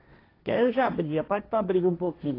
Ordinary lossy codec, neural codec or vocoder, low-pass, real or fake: AAC, 24 kbps; codec, 16 kHz, 1.1 kbps, Voila-Tokenizer; 5.4 kHz; fake